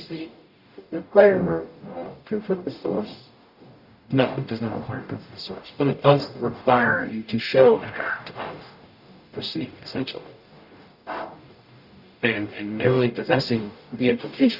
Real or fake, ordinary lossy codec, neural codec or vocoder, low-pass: fake; Opus, 64 kbps; codec, 44.1 kHz, 0.9 kbps, DAC; 5.4 kHz